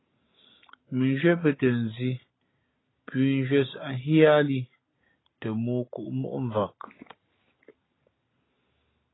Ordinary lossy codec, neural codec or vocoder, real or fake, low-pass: AAC, 16 kbps; none; real; 7.2 kHz